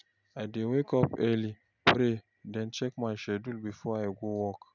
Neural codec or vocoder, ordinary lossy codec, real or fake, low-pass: none; none; real; 7.2 kHz